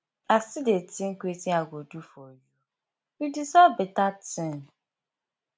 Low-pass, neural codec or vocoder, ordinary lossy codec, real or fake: none; none; none; real